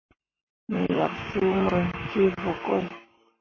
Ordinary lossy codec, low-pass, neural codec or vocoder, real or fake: MP3, 32 kbps; 7.2 kHz; codec, 44.1 kHz, 7.8 kbps, Pupu-Codec; fake